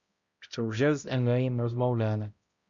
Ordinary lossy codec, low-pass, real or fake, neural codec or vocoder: Opus, 64 kbps; 7.2 kHz; fake; codec, 16 kHz, 0.5 kbps, X-Codec, HuBERT features, trained on balanced general audio